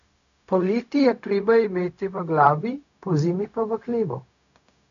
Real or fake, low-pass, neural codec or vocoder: fake; 7.2 kHz; codec, 16 kHz, 0.4 kbps, LongCat-Audio-Codec